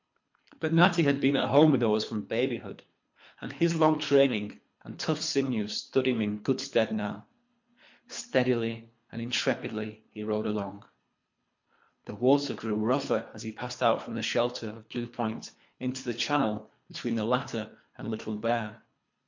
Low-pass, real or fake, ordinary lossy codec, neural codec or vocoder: 7.2 kHz; fake; MP3, 48 kbps; codec, 24 kHz, 3 kbps, HILCodec